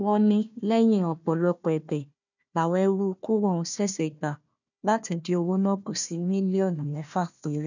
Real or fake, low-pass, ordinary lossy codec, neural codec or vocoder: fake; 7.2 kHz; none; codec, 16 kHz, 1 kbps, FunCodec, trained on Chinese and English, 50 frames a second